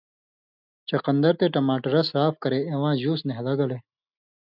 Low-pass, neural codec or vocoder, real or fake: 5.4 kHz; none; real